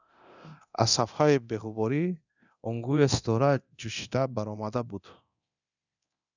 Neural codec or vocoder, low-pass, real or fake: codec, 24 kHz, 0.9 kbps, DualCodec; 7.2 kHz; fake